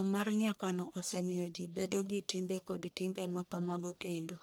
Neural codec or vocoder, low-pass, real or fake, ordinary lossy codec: codec, 44.1 kHz, 1.7 kbps, Pupu-Codec; none; fake; none